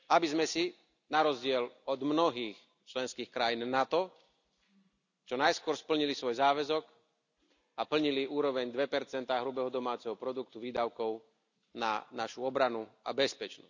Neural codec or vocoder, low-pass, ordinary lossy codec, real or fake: none; 7.2 kHz; none; real